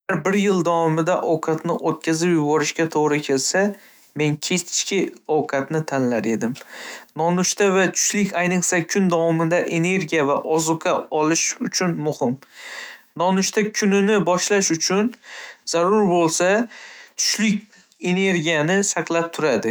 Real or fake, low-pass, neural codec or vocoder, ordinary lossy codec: fake; none; autoencoder, 48 kHz, 128 numbers a frame, DAC-VAE, trained on Japanese speech; none